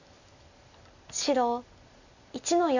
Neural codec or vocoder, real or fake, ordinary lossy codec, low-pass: none; real; none; 7.2 kHz